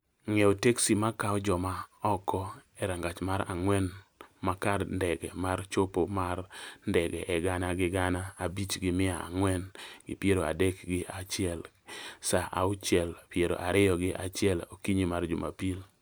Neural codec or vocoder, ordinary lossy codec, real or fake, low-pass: none; none; real; none